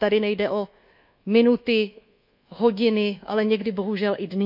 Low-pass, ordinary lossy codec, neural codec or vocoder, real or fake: 5.4 kHz; none; codec, 24 kHz, 1.2 kbps, DualCodec; fake